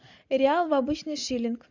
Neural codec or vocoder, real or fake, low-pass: none; real; 7.2 kHz